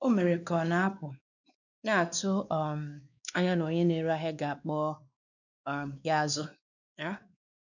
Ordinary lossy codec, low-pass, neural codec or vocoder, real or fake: none; 7.2 kHz; codec, 16 kHz, 2 kbps, X-Codec, WavLM features, trained on Multilingual LibriSpeech; fake